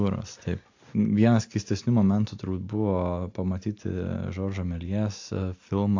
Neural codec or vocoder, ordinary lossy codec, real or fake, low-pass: none; AAC, 48 kbps; real; 7.2 kHz